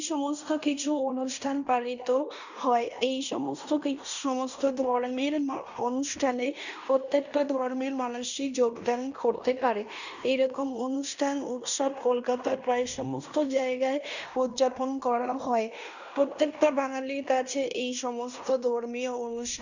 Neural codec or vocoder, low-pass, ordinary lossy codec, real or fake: codec, 16 kHz in and 24 kHz out, 0.9 kbps, LongCat-Audio-Codec, fine tuned four codebook decoder; 7.2 kHz; none; fake